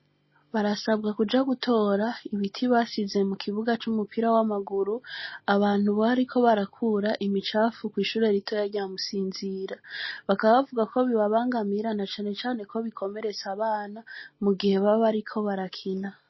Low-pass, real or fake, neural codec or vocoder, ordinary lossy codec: 7.2 kHz; real; none; MP3, 24 kbps